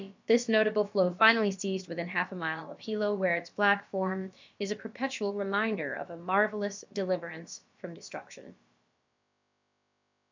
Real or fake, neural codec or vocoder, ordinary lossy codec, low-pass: fake; codec, 16 kHz, about 1 kbps, DyCAST, with the encoder's durations; MP3, 64 kbps; 7.2 kHz